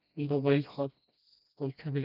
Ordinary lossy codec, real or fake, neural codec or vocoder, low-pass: none; fake; codec, 16 kHz, 1 kbps, FreqCodec, smaller model; 5.4 kHz